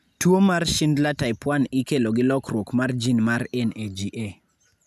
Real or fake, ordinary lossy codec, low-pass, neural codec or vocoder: real; none; 14.4 kHz; none